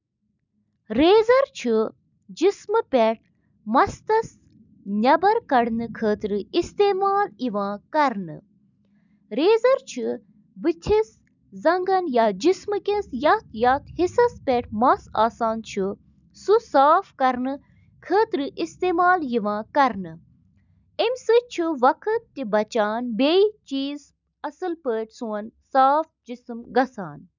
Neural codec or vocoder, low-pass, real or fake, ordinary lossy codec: none; 7.2 kHz; real; none